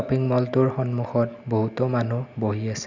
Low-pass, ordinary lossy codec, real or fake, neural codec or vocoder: 7.2 kHz; Opus, 64 kbps; real; none